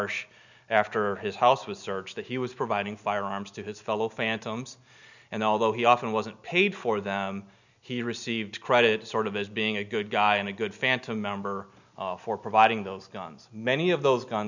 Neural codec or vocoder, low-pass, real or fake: none; 7.2 kHz; real